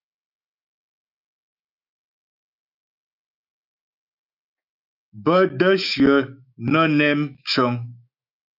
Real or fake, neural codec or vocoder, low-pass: fake; autoencoder, 48 kHz, 128 numbers a frame, DAC-VAE, trained on Japanese speech; 5.4 kHz